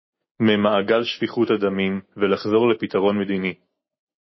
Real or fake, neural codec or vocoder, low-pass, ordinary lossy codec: real; none; 7.2 kHz; MP3, 24 kbps